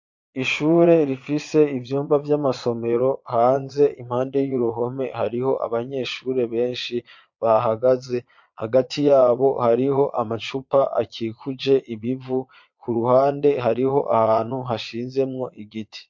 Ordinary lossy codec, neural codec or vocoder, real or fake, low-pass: MP3, 48 kbps; vocoder, 22.05 kHz, 80 mel bands, Vocos; fake; 7.2 kHz